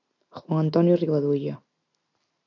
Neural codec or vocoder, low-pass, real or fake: none; 7.2 kHz; real